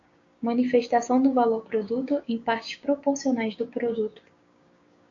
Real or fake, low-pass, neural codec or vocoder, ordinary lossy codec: fake; 7.2 kHz; codec, 16 kHz, 6 kbps, DAC; AAC, 48 kbps